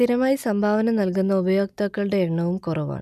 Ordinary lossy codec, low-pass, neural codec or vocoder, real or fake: none; 14.4 kHz; none; real